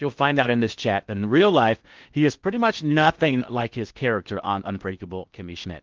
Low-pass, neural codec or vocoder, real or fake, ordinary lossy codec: 7.2 kHz; codec, 16 kHz in and 24 kHz out, 0.8 kbps, FocalCodec, streaming, 65536 codes; fake; Opus, 32 kbps